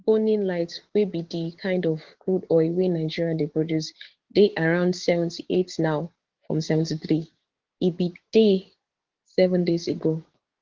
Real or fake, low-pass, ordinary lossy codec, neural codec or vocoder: fake; 7.2 kHz; Opus, 16 kbps; codec, 16 kHz, 6 kbps, DAC